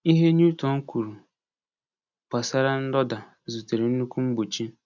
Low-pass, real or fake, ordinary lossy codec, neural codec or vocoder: 7.2 kHz; real; none; none